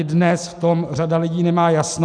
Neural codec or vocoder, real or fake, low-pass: none; real; 9.9 kHz